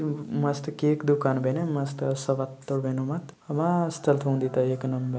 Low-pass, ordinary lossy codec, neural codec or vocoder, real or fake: none; none; none; real